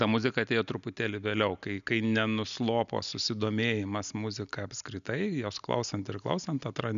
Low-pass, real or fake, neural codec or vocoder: 7.2 kHz; real; none